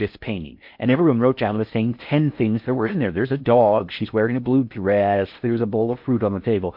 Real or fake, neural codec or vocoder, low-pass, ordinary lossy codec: fake; codec, 16 kHz in and 24 kHz out, 0.6 kbps, FocalCodec, streaming, 4096 codes; 5.4 kHz; MP3, 48 kbps